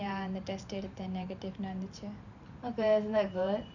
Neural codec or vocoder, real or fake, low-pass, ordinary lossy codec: vocoder, 44.1 kHz, 128 mel bands every 512 samples, BigVGAN v2; fake; 7.2 kHz; none